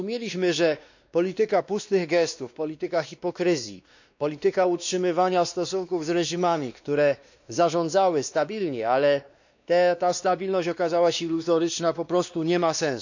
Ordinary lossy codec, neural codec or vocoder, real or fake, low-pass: none; codec, 16 kHz, 2 kbps, X-Codec, WavLM features, trained on Multilingual LibriSpeech; fake; 7.2 kHz